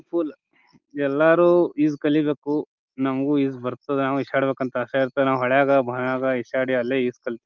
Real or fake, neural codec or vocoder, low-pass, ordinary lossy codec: real; none; 7.2 kHz; Opus, 32 kbps